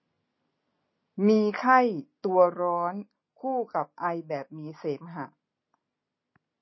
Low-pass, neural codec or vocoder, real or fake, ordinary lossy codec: 7.2 kHz; none; real; MP3, 24 kbps